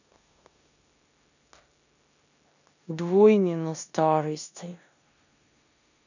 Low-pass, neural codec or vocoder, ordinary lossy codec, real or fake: 7.2 kHz; codec, 16 kHz in and 24 kHz out, 0.9 kbps, LongCat-Audio-Codec, four codebook decoder; none; fake